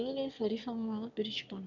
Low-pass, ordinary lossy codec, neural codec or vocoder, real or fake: 7.2 kHz; none; autoencoder, 22.05 kHz, a latent of 192 numbers a frame, VITS, trained on one speaker; fake